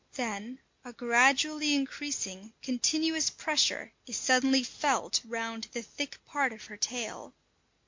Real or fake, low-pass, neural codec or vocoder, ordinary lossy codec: real; 7.2 kHz; none; MP3, 48 kbps